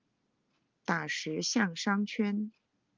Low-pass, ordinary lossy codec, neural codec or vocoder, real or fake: 7.2 kHz; Opus, 32 kbps; none; real